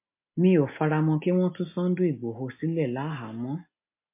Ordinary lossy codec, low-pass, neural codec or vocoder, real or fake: MP3, 24 kbps; 3.6 kHz; none; real